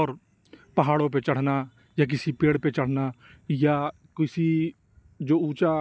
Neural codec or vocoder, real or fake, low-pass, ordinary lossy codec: none; real; none; none